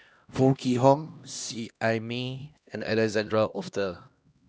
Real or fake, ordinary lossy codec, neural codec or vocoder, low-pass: fake; none; codec, 16 kHz, 1 kbps, X-Codec, HuBERT features, trained on LibriSpeech; none